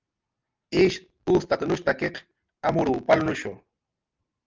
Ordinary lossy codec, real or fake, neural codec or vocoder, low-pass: Opus, 24 kbps; real; none; 7.2 kHz